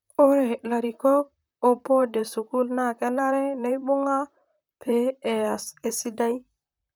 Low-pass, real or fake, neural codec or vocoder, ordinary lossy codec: none; fake; vocoder, 44.1 kHz, 128 mel bands, Pupu-Vocoder; none